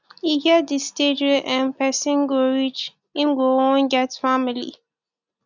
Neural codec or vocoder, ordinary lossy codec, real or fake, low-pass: none; none; real; 7.2 kHz